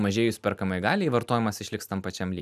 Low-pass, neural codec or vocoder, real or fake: 14.4 kHz; none; real